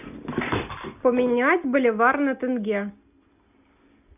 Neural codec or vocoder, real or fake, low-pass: none; real; 3.6 kHz